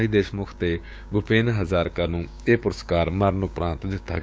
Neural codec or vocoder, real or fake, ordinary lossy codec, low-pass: codec, 16 kHz, 6 kbps, DAC; fake; none; none